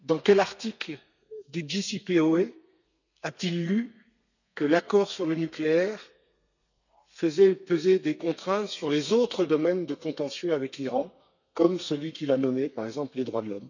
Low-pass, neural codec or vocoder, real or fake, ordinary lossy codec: 7.2 kHz; codec, 32 kHz, 1.9 kbps, SNAC; fake; none